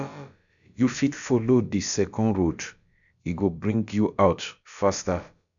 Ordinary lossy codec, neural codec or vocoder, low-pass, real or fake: none; codec, 16 kHz, about 1 kbps, DyCAST, with the encoder's durations; 7.2 kHz; fake